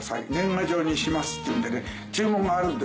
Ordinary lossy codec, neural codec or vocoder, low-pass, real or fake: none; none; none; real